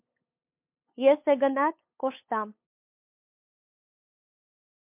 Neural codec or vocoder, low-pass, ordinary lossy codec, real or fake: codec, 16 kHz, 8 kbps, FunCodec, trained on LibriTTS, 25 frames a second; 3.6 kHz; MP3, 32 kbps; fake